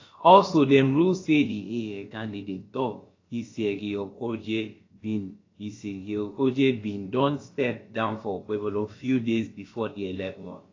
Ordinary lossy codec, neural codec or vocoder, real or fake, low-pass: AAC, 32 kbps; codec, 16 kHz, about 1 kbps, DyCAST, with the encoder's durations; fake; 7.2 kHz